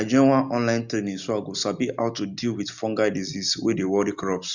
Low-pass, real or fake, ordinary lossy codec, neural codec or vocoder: 7.2 kHz; real; none; none